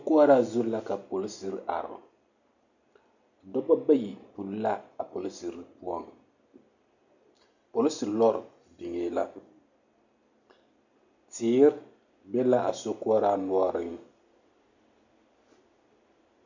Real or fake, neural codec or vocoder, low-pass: real; none; 7.2 kHz